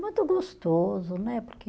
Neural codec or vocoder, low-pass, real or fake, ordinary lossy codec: none; none; real; none